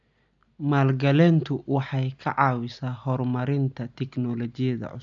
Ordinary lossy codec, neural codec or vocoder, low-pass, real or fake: AAC, 64 kbps; none; 7.2 kHz; real